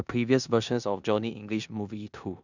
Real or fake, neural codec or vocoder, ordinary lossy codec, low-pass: fake; codec, 16 kHz in and 24 kHz out, 0.9 kbps, LongCat-Audio-Codec, four codebook decoder; none; 7.2 kHz